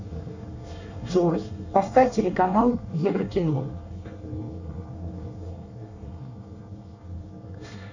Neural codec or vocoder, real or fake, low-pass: codec, 24 kHz, 1 kbps, SNAC; fake; 7.2 kHz